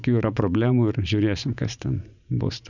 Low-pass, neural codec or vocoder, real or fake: 7.2 kHz; vocoder, 44.1 kHz, 80 mel bands, Vocos; fake